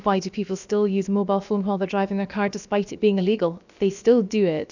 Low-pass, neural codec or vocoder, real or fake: 7.2 kHz; codec, 16 kHz, about 1 kbps, DyCAST, with the encoder's durations; fake